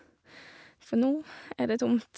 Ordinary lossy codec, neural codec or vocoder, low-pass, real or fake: none; none; none; real